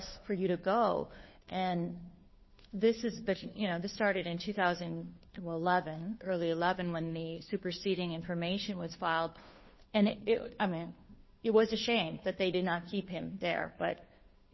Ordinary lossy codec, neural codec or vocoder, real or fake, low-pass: MP3, 24 kbps; codec, 16 kHz, 2 kbps, FunCodec, trained on Chinese and English, 25 frames a second; fake; 7.2 kHz